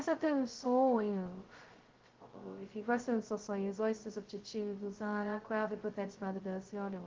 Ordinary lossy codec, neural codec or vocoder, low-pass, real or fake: Opus, 16 kbps; codec, 16 kHz, 0.2 kbps, FocalCodec; 7.2 kHz; fake